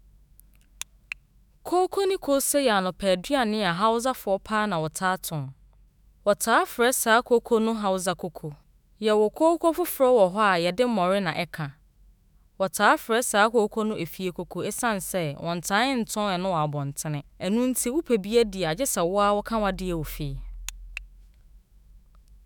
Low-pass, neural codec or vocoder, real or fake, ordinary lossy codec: none; autoencoder, 48 kHz, 128 numbers a frame, DAC-VAE, trained on Japanese speech; fake; none